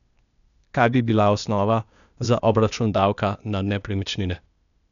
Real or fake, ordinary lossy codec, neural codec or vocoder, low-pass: fake; none; codec, 16 kHz, 0.8 kbps, ZipCodec; 7.2 kHz